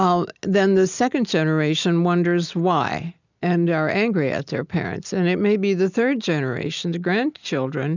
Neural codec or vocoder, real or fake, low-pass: none; real; 7.2 kHz